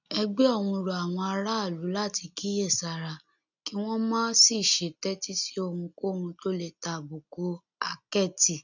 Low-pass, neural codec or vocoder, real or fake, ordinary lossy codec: 7.2 kHz; none; real; none